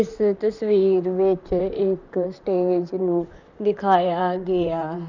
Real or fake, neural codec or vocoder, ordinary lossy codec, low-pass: fake; vocoder, 44.1 kHz, 128 mel bands, Pupu-Vocoder; none; 7.2 kHz